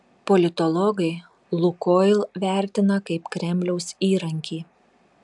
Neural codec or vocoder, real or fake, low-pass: none; real; 10.8 kHz